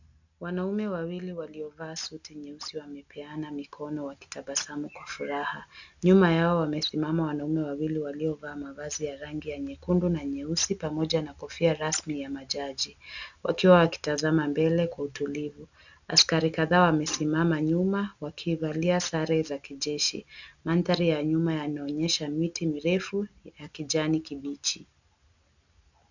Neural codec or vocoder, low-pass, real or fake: none; 7.2 kHz; real